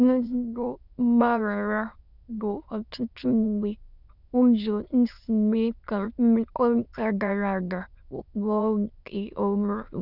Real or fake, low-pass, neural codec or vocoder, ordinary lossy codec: fake; 5.4 kHz; autoencoder, 22.05 kHz, a latent of 192 numbers a frame, VITS, trained on many speakers; none